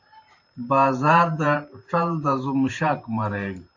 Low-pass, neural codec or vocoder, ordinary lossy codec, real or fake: 7.2 kHz; vocoder, 44.1 kHz, 128 mel bands every 512 samples, BigVGAN v2; AAC, 48 kbps; fake